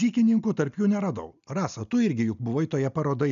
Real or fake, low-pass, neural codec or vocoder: real; 7.2 kHz; none